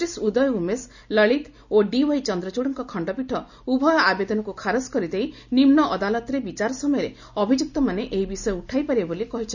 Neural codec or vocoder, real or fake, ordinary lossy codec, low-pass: none; real; none; 7.2 kHz